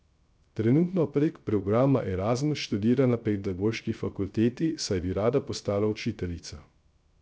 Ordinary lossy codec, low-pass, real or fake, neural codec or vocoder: none; none; fake; codec, 16 kHz, 0.3 kbps, FocalCodec